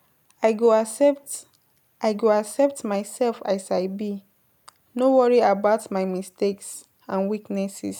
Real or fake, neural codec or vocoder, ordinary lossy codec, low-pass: real; none; none; 19.8 kHz